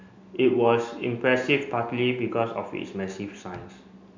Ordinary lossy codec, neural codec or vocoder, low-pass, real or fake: MP3, 64 kbps; none; 7.2 kHz; real